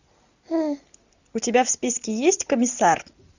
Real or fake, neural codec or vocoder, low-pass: real; none; 7.2 kHz